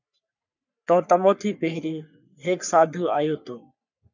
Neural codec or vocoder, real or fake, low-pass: codec, 44.1 kHz, 3.4 kbps, Pupu-Codec; fake; 7.2 kHz